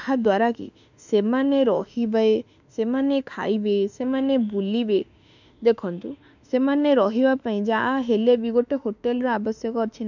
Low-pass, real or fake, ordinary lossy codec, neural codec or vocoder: 7.2 kHz; fake; none; autoencoder, 48 kHz, 32 numbers a frame, DAC-VAE, trained on Japanese speech